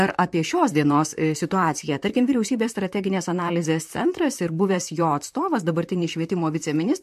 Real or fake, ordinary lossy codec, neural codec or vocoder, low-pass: fake; MP3, 64 kbps; vocoder, 44.1 kHz, 128 mel bands, Pupu-Vocoder; 14.4 kHz